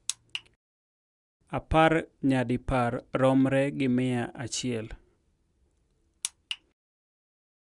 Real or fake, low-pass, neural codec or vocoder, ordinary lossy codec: real; 10.8 kHz; none; Opus, 64 kbps